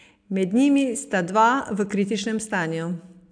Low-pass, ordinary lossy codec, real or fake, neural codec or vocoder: 9.9 kHz; AAC, 64 kbps; real; none